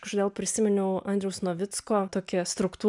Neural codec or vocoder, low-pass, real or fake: none; 10.8 kHz; real